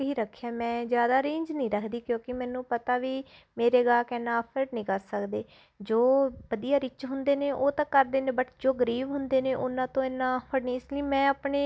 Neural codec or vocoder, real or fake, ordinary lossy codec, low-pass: none; real; none; none